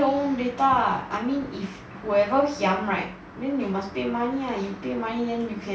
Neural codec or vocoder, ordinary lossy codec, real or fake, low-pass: none; none; real; none